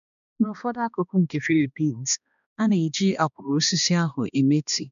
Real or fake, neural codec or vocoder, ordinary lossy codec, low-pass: fake; codec, 16 kHz, 2 kbps, X-Codec, HuBERT features, trained on balanced general audio; none; 7.2 kHz